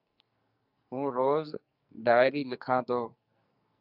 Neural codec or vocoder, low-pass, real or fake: codec, 44.1 kHz, 2.6 kbps, SNAC; 5.4 kHz; fake